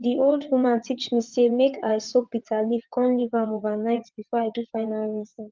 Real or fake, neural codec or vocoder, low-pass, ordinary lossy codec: fake; vocoder, 22.05 kHz, 80 mel bands, WaveNeXt; 7.2 kHz; Opus, 32 kbps